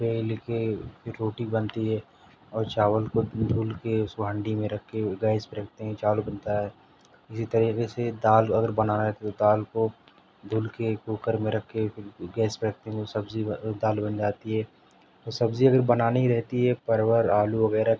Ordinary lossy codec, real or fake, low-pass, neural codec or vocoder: none; real; none; none